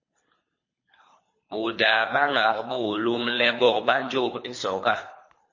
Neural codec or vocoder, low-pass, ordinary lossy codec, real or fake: codec, 24 kHz, 3 kbps, HILCodec; 7.2 kHz; MP3, 32 kbps; fake